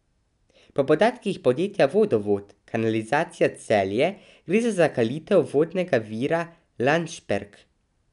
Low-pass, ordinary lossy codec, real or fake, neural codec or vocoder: 10.8 kHz; none; real; none